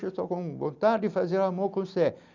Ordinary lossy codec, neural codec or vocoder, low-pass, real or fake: none; none; 7.2 kHz; real